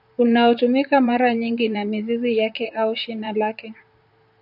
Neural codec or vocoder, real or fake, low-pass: vocoder, 44.1 kHz, 128 mel bands, Pupu-Vocoder; fake; 5.4 kHz